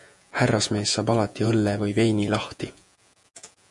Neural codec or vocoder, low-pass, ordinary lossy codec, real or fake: vocoder, 48 kHz, 128 mel bands, Vocos; 10.8 kHz; MP3, 64 kbps; fake